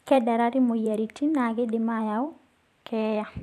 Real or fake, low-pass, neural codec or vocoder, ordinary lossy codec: fake; 14.4 kHz; vocoder, 44.1 kHz, 128 mel bands every 512 samples, BigVGAN v2; MP3, 96 kbps